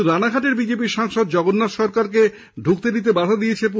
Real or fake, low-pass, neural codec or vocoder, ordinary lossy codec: real; none; none; none